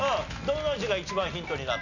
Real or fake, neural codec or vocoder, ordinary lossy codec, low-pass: real; none; none; 7.2 kHz